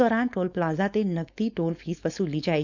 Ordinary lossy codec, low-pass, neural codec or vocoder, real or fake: none; 7.2 kHz; codec, 16 kHz, 4.8 kbps, FACodec; fake